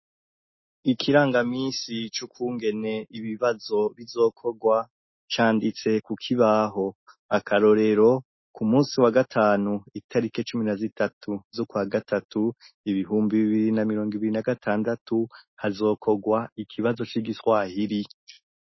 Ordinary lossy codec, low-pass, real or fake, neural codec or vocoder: MP3, 24 kbps; 7.2 kHz; real; none